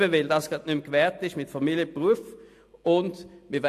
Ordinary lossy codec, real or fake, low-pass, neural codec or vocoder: AAC, 64 kbps; real; 14.4 kHz; none